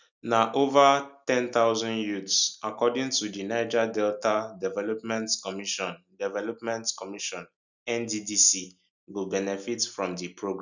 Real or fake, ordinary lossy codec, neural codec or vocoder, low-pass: real; none; none; 7.2 kHz